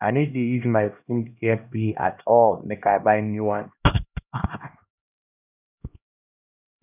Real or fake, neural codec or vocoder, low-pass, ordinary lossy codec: fake; codec, 16 kHz, 1 kbps, X-Codec, HuBERT features, trained on LibriSpeech; 3.6 kHz; none